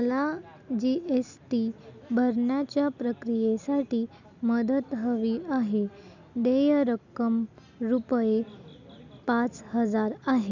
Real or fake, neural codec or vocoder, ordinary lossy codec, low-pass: real; none; none; 7.2 kHz